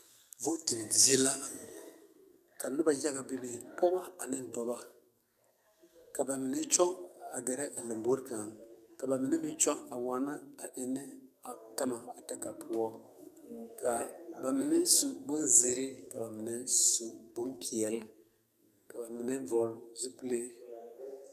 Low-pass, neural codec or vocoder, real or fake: 14.4 kHz; codec, 44.1 kHz, 2.6 kbps, SNAC; fake